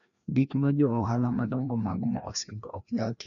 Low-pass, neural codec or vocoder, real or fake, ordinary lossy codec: 7.2 kHz; codec, 16 kHz, 1 kbps, FreqCodec, larger model; fake; none